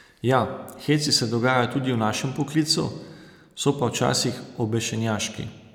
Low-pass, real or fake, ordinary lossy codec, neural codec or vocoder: 19.8 kHz; real; none; none